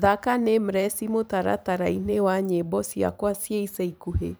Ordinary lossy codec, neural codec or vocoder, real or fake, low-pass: none; vocoder, 44.1 kHz, 128 mel bands every 512 samples, BigVGAN v2; fake; none